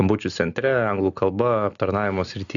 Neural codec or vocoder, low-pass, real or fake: none; 7.2 kHz; real